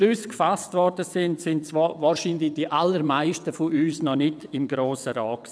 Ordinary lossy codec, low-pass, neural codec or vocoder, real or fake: none; none; vocoder, 22.05 kHz, 80 mel bands, WaveNeXt; fake